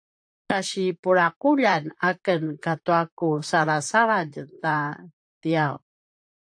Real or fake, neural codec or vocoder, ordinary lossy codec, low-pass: fake; vocoder, 44.1 kHz, 128 mel bands, Pupu-Vocoder; AAC, 64 kbps; 9.9 kHz